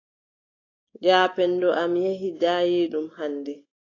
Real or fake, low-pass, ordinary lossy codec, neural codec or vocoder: real; 7.2 kHz; AAC, 32 kbps; none